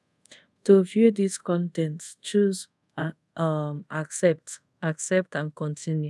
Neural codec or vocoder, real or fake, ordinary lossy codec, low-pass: codec, 24 kHz, 0.5 kbps, DualCodec; fake; none; none